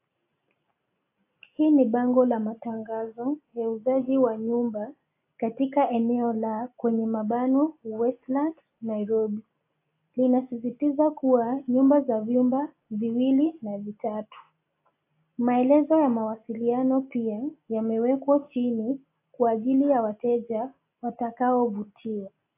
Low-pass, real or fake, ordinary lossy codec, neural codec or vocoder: 3.6 kHz; real; AAC, 24 kbps; none